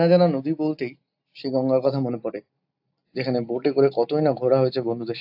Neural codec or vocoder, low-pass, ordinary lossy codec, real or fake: none; 5.4 kHz; AAC, 48 kbps; real